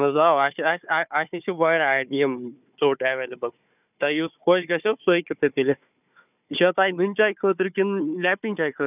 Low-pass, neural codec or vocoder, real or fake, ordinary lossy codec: 3.6 kHz; codec, 16 kHz, 4 kbps, FunCodec, trained on Chinese and English, 50 frames a second; fake; none